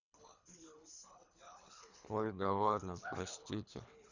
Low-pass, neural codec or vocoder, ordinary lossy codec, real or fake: 7.2 kHz; codec, 24 kHz, 3 kbps, HILCodec; none; fake